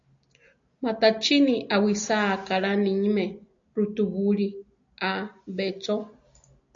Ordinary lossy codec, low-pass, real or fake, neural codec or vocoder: AAC, 64 kbps; 7.2 kHz; real; none